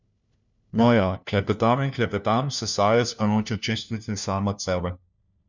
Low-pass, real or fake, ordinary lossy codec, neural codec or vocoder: 7.2 kHz; fake; none; codec, 16 kHz, 1 kbps, FunCodec, trained on LibriTTS, 50 frames a second